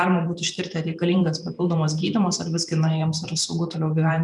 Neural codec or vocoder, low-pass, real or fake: vocoder, 44.1 kHz, 128 mel bands every 512 samples, BigVGAN v2; 10.8 kHz; fake